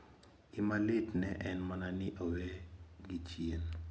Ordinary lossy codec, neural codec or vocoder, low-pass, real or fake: none; none; none; real